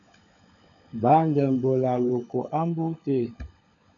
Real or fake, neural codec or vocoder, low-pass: fake; codec, 16 kHz, 16 kbps, FunCodec, trained on LibriTTS, 50 frames a second; 7.2 kHz